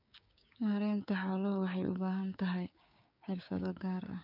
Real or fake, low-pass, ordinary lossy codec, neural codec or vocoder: fake; 5.4 kHz; none; codec, 16 kHz, 6 kbps, DAC